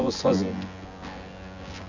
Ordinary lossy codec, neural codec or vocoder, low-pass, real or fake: none; vocoder, 24 kHz, 100 mel bands, Vocos; 7.2 kHz; fake